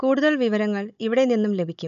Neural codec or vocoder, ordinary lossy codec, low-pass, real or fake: codec, 16 kHz, 16 kbps, FunCodec, trained on Chinese and English, 50 frames a second; none; 7.2 kHz; fake